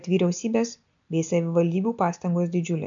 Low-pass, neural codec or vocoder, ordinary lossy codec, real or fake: 7.2 kHz; none; AAC, 64 kbps; real